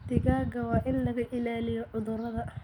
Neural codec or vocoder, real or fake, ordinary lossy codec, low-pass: none; real; none; 19.8 kHz